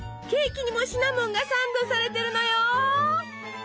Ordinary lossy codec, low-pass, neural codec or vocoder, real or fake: none; none; none; real